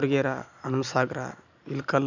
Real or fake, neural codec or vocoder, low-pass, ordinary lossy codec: real; none; 7.2 kHz; none